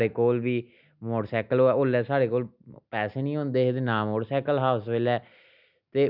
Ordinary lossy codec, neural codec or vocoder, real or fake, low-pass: none; none; real; 5.4 kHz